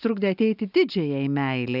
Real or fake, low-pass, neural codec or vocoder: real; 5.4 kHz; none